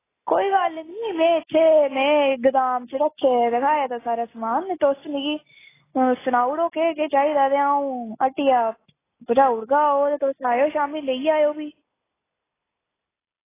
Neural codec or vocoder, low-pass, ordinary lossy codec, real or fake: none; 3.6 kHz; AAC, 16 kbps; real